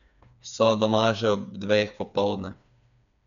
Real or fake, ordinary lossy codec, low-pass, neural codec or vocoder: fake; none; 7.2 kHz; codec, 16 kHz, 4 kbps, FreqCodec, smaller model